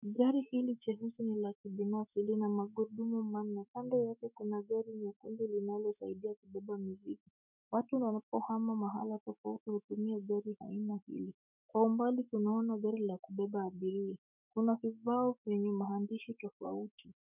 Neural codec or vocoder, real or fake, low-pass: autoencoder, 48 kHz, 128 numbers a frame, DAC-VAE, trained on Japanese speech; fake; 3.6 kHz